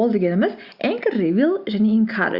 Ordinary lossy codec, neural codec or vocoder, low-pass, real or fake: Opus, 64 kbps; none; 5.4 kHz; real